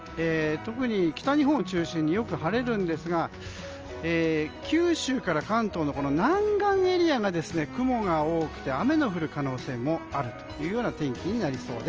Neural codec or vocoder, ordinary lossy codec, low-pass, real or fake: none; Opus, 24 kbps; 7.2 kHz; real